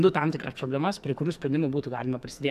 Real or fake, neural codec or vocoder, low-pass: fake; codec, 44.1 kHz, 2.6 kbps, SNAC; 14.4 kHz